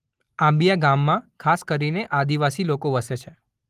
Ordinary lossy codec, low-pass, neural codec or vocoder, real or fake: Opus, 24 kbps; 14.4 kHz; none; real